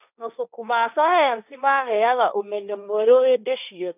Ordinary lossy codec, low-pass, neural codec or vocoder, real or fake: none; 3.6 kHz; codec, 16 kHz, 1.1 kbps, Voila-Tokenizer; fake